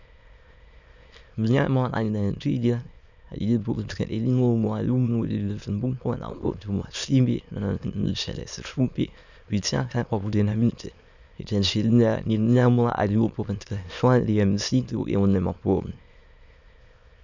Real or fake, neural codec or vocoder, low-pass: fake; autoencoder, 22.05 kHz, a latent of 192 numbers a frame, VITS, trained on many speakers; 7.2 kHz